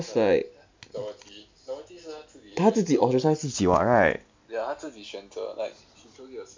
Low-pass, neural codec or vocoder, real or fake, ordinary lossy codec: 7.2 kHz; none; real; MP3, 64 kbps